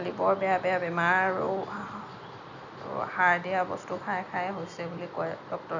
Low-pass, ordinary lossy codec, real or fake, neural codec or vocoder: 7.2 kHz; none; real; none